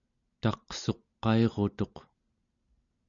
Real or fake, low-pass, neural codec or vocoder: real; 7.2 kHz; none